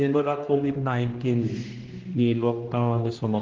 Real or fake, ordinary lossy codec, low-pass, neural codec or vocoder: fake; Opus, 16 kbps; 7.2 kHz; codec, 16 kHz, 1 kbps, X-Codec, HuBERT features, trained on general audio